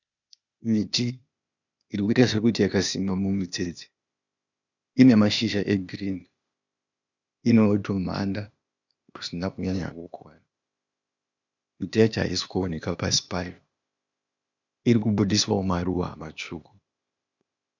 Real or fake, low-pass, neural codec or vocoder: fake; 7.2 kHz; codec, 16 kHz, 0.8 kbps, ZipCodec